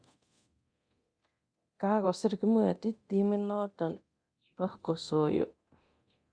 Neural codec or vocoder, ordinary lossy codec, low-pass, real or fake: codec, 24 kHz, 0.9 kbps, DualCodec; Opus, 64 kbps; 9.9 kHz; fake